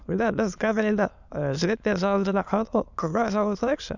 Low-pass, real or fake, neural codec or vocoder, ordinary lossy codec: 7.2 kHz; fake; autoencoder, 22.05 kHz, a latent of 192 numbers a frame, VITS, trained on many speakers; none